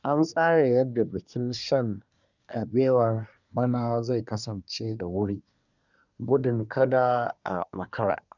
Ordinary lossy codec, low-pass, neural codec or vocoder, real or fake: none; 7.2 kHz; codec, 24 kHz, 1 kbps, SNAC; fake